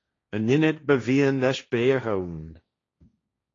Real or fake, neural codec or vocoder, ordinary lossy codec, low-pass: fake; codec, 16 kHz, 1.1 kbps, Voila-Tokenizer; AAC, 32 kbps; 7.2 kHz